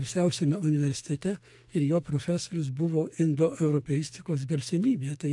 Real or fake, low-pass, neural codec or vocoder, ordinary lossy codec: fake; 10.8 kHz; codec, 44.1 kHz, 2.6 kbps, SNAC; AAC, 64 kbps